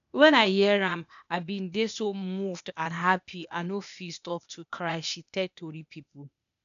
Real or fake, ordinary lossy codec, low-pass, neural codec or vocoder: fake; none; 7.2 kHz; codec, 16 kHz, 0.8 kbps, ZipCodec